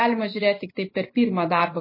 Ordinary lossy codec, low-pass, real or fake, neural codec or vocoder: MP3, 24 kbps; 5.4 kHz; real; none